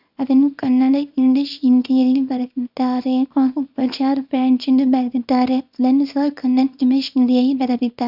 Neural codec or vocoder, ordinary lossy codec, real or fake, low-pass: codec, 24 kHz, 0.9 kbps, WavTokenizer, small release; none; fake; 5.4 kHz